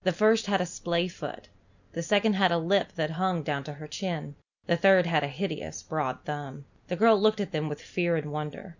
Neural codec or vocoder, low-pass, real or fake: none; 7.2 kHz; real